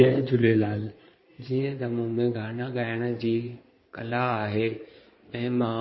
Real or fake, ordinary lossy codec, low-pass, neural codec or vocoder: fake; MP3, 24 kbps; 7.2 kHz; codec, 16 kHz in and 24 kHz out, 2.2 kbps, FireRedTTS-2 codec